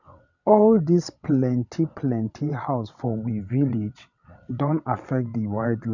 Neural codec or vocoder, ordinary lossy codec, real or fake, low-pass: vocoder, 22.05 kHz, 80 mel bands, WaveNeXt; none; fake; 7.2 kHz